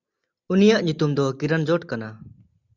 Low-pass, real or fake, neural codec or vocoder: 7.2 kHz; real; none